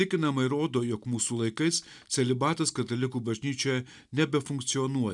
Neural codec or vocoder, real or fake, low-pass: none; real; 10.8 kHz